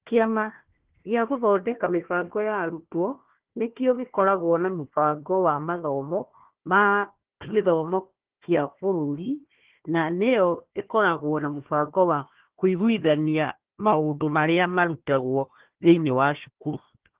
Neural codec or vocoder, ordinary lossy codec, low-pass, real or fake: codec, 16 kHz, 1 kbps, FunCodec, trained on Chinese and English, 50 frames a second; Opus, 16 kbps; 3.6 kHz; fake